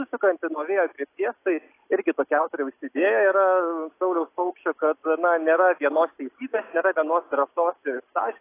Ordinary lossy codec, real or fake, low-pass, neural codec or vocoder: AAC, 24 kbps; real; 3.6 kHz; none